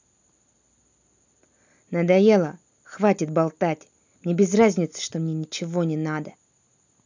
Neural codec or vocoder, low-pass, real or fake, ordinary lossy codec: none; 7.2 kHz; real; none